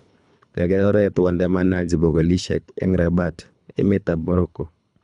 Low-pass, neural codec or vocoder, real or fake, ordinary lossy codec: 10.8 kHz; codec, 24 kHz, 3 kbps, HILCodec; fake; none